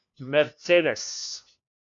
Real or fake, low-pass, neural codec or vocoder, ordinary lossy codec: fake; 7.2 kHz; codec, 16 kHz, 1 kbps, FunCodec, trained on LibriTTS, 50 frames a second; AAC, 48 kbps